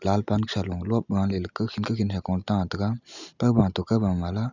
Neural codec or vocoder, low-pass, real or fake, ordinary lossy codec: none; 7.2 kHz; real; none